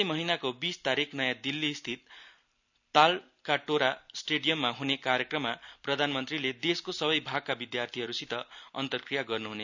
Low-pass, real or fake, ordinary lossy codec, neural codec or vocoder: 7.2 kHz; real; none; none